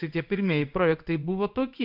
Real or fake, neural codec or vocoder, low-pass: fake; codec, 16 kHz in and 24 kHz out, 1 kbps, XY-Tokenizer; 5.4 kHz